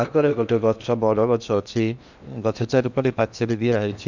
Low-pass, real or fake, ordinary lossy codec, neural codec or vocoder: 7.2 kHz; fake; none; codec, 16 kHz in and 24 kHz out, 0.8 kbps, FocalCodec, streaming, 65536 codes